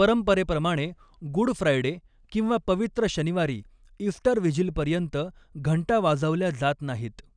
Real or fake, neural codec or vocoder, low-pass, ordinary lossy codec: real; none; 9.9 kHz; none